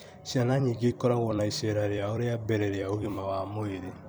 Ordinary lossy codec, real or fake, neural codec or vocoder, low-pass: none; fake; vocoder, 44.1 kHz, 128 mel bands every 256 samples, BigVGAN v2; none